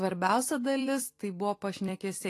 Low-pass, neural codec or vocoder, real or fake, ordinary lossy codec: 14.4 kHz; vocoder, 44.1 kHz, 128 mel bands every 256 samples, BigVGAN v2; fake; AAC, 48 kbps